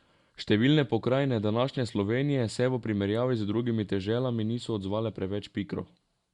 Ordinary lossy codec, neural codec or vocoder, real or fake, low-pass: Opus, 64 kbps; none; real; 10.8 kHz